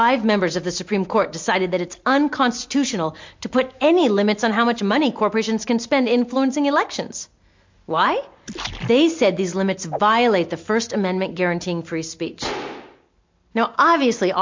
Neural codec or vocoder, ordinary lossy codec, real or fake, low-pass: none; MP3, 48 kbps; real; 7.2 kHz